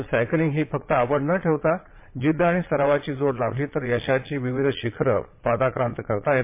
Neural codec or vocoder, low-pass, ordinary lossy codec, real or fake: vocoder, 22.05 kHz, 80 mel bands, Vocos; 3.6 kHz; MP3, 24 kbps; fake